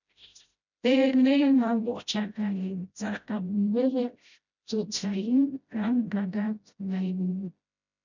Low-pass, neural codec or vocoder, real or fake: 7.2 kHz; codec, 16 kHz, 0.5 kbps, FreqCodec, smaller model; fake